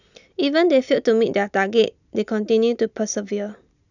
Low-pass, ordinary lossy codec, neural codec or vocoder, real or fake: 7.2 kHz; none; none; real